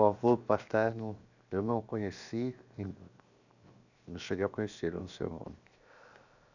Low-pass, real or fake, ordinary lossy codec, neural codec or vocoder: 7.2 kHz; fake; none; codec, 16 kHz, 0.7 kbps, FocalCodec